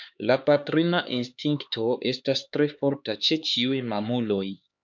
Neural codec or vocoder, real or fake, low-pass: codec, 16 kHz, 4 kbps, X-Codec, HuBERT features, trained on LibriSpeech; fake; 7.2 kHz